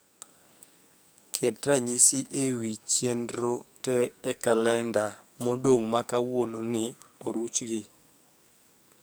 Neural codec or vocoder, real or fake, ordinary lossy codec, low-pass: codec, 44.1 kHz, 2.6 kbps, SNAC; fake; none; none